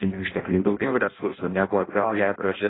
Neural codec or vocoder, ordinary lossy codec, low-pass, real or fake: codec, 16 kHz in and 24 kHz out, 0.6 kbps, FireRedTTS-2 codec; AAC, 16 kbps; 7.2 kHz; fake